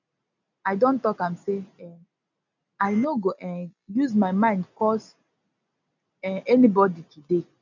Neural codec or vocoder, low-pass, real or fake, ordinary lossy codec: none; 7.2 kHz; real; none